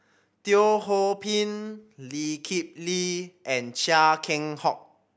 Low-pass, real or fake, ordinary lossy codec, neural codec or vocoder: none; real; none; none